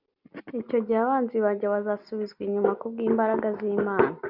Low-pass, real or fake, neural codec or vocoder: 5.4 kHz; real; none